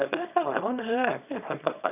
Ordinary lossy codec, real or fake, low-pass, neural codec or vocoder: none; fake; 3.6 kHz; codec, 24 kHz, 0.9 kbps, WavTokenizer, small release